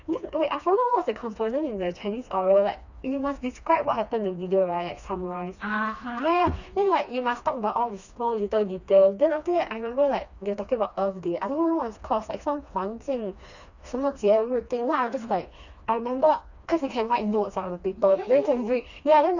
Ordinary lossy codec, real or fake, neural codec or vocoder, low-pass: none; fake; codec, 16 kHz, 2 kbps, FreqCodec, smaller model; 7.2 kHz